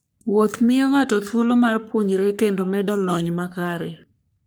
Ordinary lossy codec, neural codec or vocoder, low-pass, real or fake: none; codec, 44.1 kHz, 3.4 kbps, Pupu-Codec; none; fake